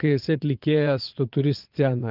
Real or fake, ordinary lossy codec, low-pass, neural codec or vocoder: fake; Opus, 32 kbps; 5.4 kHz; vocoder, 22.05 kHz, 80 mel bands, Vocos